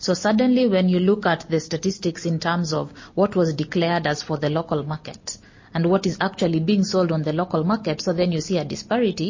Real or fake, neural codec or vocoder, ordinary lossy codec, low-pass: real; none; MP3, 32 kbps; 7.2 kHz